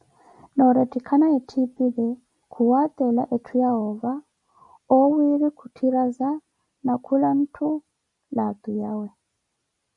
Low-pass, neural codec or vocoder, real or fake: 10.8 kHz; none; real